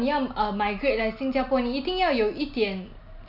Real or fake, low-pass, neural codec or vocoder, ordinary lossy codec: real; 5.4 kHz; none; none